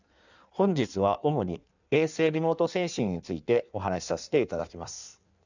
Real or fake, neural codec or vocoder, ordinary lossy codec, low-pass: fake; codec, 16 kHz in and 24 kHz out, 1.1 kbps, FireRedTTS-2 codec; none; 7.2 kHz